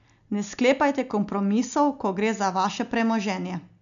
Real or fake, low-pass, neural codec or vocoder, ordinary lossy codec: real; 7.2 kHz; none; none